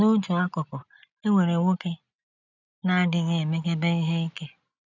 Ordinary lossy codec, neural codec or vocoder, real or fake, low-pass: none; none; real; 7.2 kHz